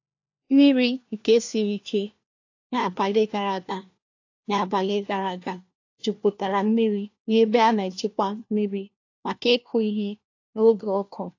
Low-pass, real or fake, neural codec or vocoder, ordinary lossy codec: 7.2 kHz; fake; codec, 16 kHz, 1 kbps, FunCodec, trained on LibriTTS, 50 frames a second; AAC, 48 kbps